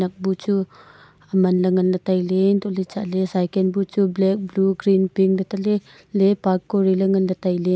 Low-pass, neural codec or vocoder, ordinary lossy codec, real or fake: none; none; none; real